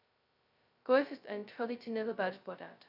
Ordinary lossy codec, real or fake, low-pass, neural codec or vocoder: none; fake; 5.4 kHz; codec, 16 kHz, 0.2 kbps, FocalCodec